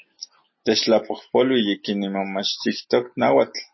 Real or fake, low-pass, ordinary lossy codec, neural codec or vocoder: real; 7.2 kHz; MP3, 24 kbps; none